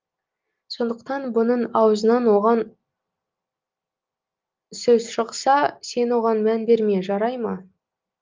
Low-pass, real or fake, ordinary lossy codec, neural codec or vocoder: 7.2 kHz; real; Opus, 32 kbps; none